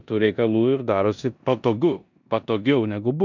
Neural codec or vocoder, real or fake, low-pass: codec, 16 kHz in and 24 kHz out, 0.9 kbps, LongCat-Audio-Codec, four codebook decoder; fake; 7.2 kHz